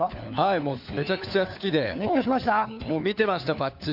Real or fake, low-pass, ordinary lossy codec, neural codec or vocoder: fake; 5.4 kHz; none; codec, 16 kHz, 4 kbps, FunCodec, trained on LibriTTS, 50 frames a second